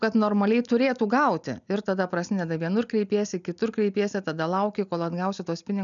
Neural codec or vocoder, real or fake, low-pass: none; real; 7.2 kHz